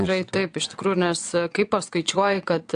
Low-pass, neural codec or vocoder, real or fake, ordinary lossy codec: 9.9 kHz; vocoder, 22.05 kHz, 80 mel bands, WaveNeXt; fake; AAC, 64 kbps